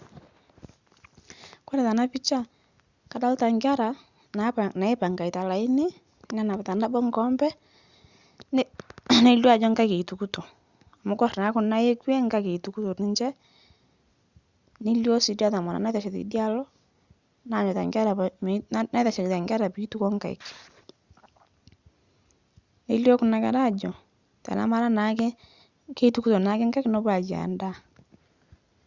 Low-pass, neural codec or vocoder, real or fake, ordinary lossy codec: 7.2 kHz; none; real; Opus, 64 kbps